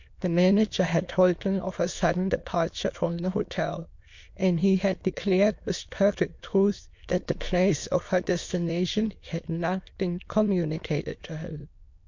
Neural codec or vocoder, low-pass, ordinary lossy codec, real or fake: autoencoder, 22.05 kHz, a latent of 192 numbers a frame, VITS, trained on many speakers; 7.2 kHz; MP3, 48 kbps; fake